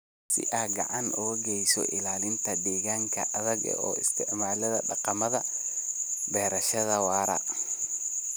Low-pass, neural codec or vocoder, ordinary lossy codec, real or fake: none; none; none; real